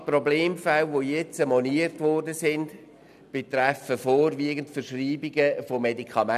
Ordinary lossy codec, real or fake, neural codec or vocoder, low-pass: none; real; none; 14.4 kHz